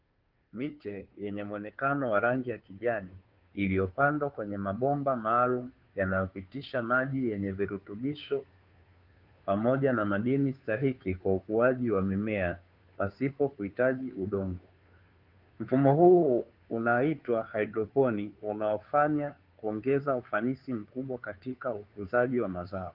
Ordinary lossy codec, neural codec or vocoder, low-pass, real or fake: Opus, 32 kbps; codec, 16 kHz, 2 kbps, FunCodec, trained on Chinese and English, 25 frames a second; 5.4 kHz; fake